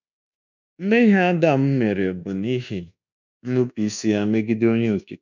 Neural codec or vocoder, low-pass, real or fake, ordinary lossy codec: codec, 24 kHz, 1.2 kbps, DualCodec; 7.2 kHz; fake; none